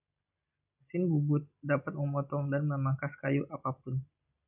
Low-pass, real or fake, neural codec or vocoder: 3.6 kHz; real; none